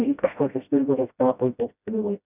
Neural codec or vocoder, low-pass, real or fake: codec, 16 kHz, 0.5 kbps, FreqCodec, smaller model; 3.6 kHz; fake